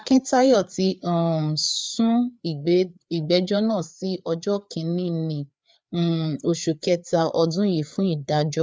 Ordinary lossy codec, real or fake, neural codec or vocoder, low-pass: none; fake; codec, 16 kHz, 4 kbps, FreqCodec, larger model; none